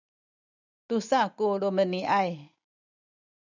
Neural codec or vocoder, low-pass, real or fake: none; 7.2 kHz; real